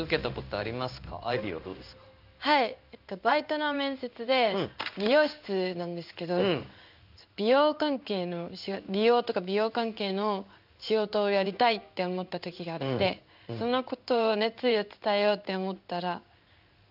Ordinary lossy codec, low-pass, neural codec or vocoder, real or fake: none; 5.4 kHz; codec, 16 kHz in and 24 kHz out, 1 kbps, XY-Tokenizer; fake